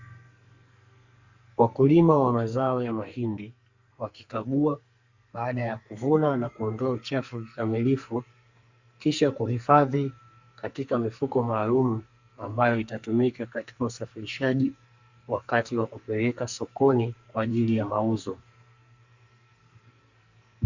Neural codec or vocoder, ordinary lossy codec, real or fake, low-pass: codec, 44.1 kHz, 2.6 kbps, SNAC; Opus, 64 kbps; fake; 7.2 kHz